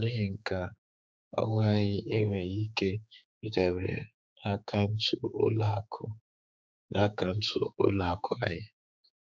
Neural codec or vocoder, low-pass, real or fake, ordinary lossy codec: codec, 16 kHz, 4 kbps, X-Codec, HuBERT features, trained on general audio; none; fake; none